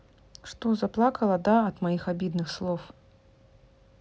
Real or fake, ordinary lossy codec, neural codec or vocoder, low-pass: real; none; none; none